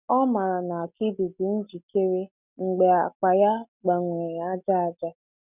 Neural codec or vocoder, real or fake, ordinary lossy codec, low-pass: none; real; none; 3.6 kHz